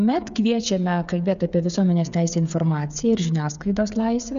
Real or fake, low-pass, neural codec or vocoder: fake; 7.2 kHz; codec, 16 kHz, 8 kbps, FreqCodec, smaller model